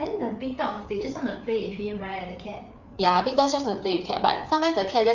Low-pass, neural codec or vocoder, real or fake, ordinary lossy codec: 7.2 kHz; codec, 16 kHz, 4 kbps, FreqCodec, larger model; fake; none